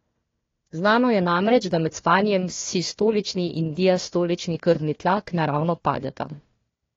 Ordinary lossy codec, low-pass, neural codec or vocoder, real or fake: AAC, 32 kbps; 7.2 kHz; codec, 16 kHz, 1 kbps, FunCodec, trained on Chinese and English, 50 frames a second; fake